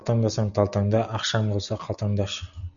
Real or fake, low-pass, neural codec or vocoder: real; 7.2 kHz; none